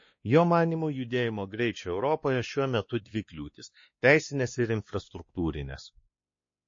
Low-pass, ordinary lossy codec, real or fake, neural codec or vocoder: 7.2 kHz; MP3, 32 kbps; fake; codec, 16 kHz, 2 kbps, X-Codec, WavLM features, trained on Multilingual LibriSpeech